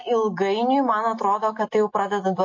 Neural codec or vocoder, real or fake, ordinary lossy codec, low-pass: none; real; MP3, 32 kbps; 7.2 kHz